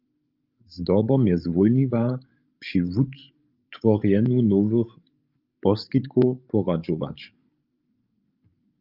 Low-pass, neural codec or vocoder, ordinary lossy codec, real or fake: 5.4 kHz; codec, 16 kHz, 16 kbps, FreqCodec, larger model; Opus, 24 kbps; fake